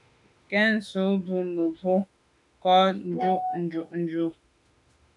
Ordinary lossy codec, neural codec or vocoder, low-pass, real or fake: MP3, 96 kbps; autoencoder, 48 kHz, 32 numbers a frame, DAC-VAE, trained on Japanese speech; 10.8 kHz; fake